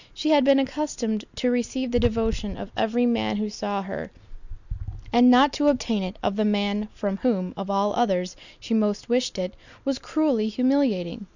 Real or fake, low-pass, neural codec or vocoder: real; 7.2 kHz; none